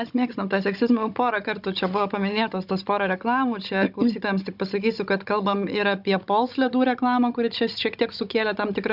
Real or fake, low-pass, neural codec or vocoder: fake; 5.4 kHz; codec, 16 kHz, 16 kbps, FunCodec, trained on Chinese and English, 50 frames a second